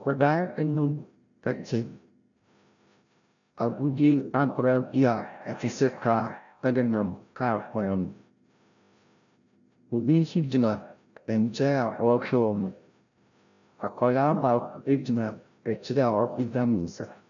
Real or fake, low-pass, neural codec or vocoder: fake; 7.2 kHz; codec, 16 kHz, 0.5 kbps, FreqCodec, larger model